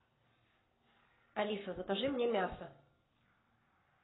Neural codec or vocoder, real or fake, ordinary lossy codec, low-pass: codec, 44.1 kHz, 7.8 kbps, Pupu-Codec; fake; AAC, 16 kbps; 7.2 kHz